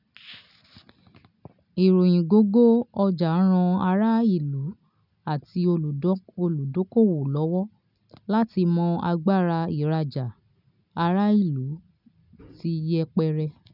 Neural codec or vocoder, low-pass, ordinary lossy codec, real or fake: none; 5.4 kHz; none; real